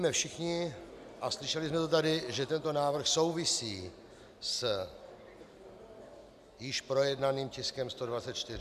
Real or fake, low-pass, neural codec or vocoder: real; 14.4 kHz; none